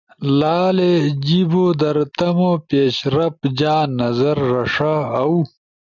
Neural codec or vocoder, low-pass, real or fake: none; 7.2 kHz; real